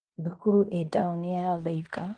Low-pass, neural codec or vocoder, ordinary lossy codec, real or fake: 9.9 kHz; codec, 16 kHz in and 24 kHz out, 0.9 kbps, LongCat-Audio-Codec, fine tuned four codebook decoder; Opus, 24 kbps; fake